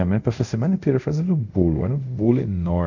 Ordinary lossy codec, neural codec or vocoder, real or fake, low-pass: none; codec, 24 kHz, 0.5 kbps, DualCodec; fake; 7.2 kHz